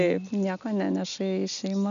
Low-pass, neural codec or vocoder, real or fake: 7.2 kHz; none; real